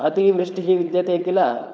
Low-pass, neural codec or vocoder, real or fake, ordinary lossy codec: none; codec, 16 kHz, 4.8 kbps, FACodec; fake; none